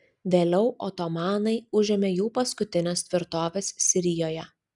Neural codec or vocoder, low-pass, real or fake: none; 10.8 kHz; real